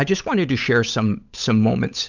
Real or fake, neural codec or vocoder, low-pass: fake; codec, 16 kHz, 8 kbps, FunCodec, trained on Chinese and English, 25 frames a second; 7.2 kHz